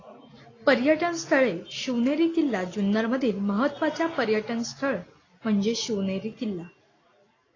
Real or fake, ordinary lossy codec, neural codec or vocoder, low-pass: real; AAC, 32 kbps; none; 7.2 kHz